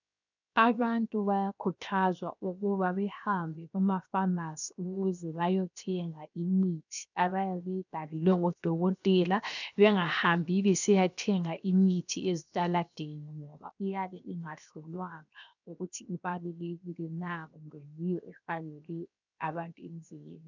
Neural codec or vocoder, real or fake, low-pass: codec, 16 kHz, 0.7 kbps, FocalCodec; fake; 7.2 kHz